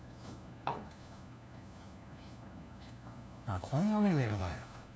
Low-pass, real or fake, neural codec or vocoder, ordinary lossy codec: none; fake; codec, 16 kHz, 1 kbps, FunCodec, trained on LibriTTS, 50 frames a second; none